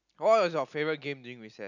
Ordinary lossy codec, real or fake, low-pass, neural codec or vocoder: none; real; 7.2 kHz; none